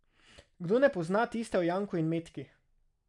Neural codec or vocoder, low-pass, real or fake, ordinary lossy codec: none; 10.8 kHz; real; none